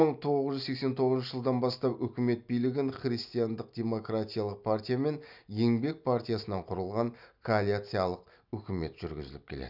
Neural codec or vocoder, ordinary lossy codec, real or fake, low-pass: none; none; real; 5.4 kHz